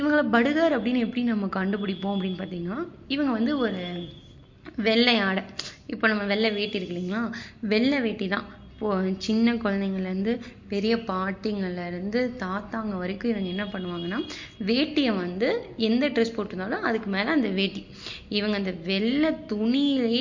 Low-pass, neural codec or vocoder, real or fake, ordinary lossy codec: 7.2 kHz; none; real; MP3, 48 kbps